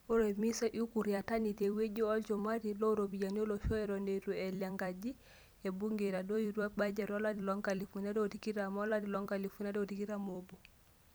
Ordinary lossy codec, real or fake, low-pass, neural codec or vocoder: none; fake; none; vocoder, 44.1 kHz, 128 mel bands every 256 samples, BigVGAN v2